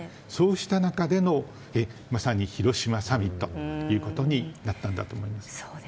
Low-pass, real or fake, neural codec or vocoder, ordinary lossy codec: none; real; none; none